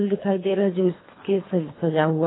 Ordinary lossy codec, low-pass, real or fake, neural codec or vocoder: AAC, 16 kbps; 7.2 kHz; fake; codec, 24 kHz, 3 kbps, HILCodec